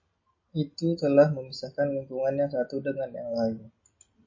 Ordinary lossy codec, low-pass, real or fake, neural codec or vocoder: MP3, 32 kbps; 7.2 kHz; real; none